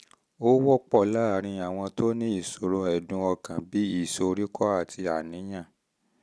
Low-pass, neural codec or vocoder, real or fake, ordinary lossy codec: none; none; real; none